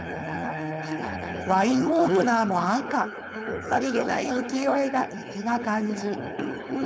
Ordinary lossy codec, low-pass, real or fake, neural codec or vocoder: none; none; fake; codec, 16 kHz, 4.8 kbps, FACodec